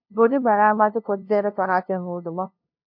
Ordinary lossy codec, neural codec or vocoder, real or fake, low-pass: MP3, 48 kbps; codec, 16 kHz, 0.5 kbps, FunCodec, trained on LibriTTS, 25 frames a second; fake; 5.4 kHz